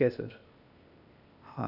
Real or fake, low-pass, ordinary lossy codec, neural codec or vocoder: fake; 5.4 kHz; none; codec, 16 kHz, 0.8 kbps, ZipCodec